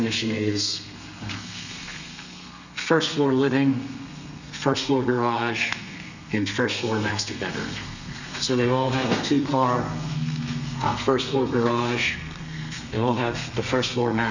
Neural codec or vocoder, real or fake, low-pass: codec, 32 kHz, 1.9 kbps, SNAC; fake; 7.2 kHz